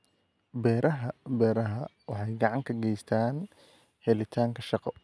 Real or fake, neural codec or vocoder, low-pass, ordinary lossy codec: real; none; none; none